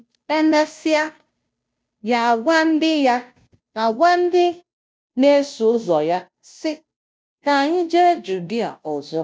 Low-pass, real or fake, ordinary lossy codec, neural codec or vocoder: none; fake; none; codec, 16 kHz, 0.5 kbps, FunCodec, trained on Chinese and English, 25 frames a second